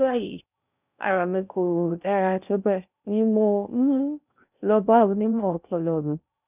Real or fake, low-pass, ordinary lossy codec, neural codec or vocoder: fake; 3.6 kHz; none; codec, 16 kHz in and 24 kHz out, 0.6 kbps, FocalCodec, streaming, 4096 codes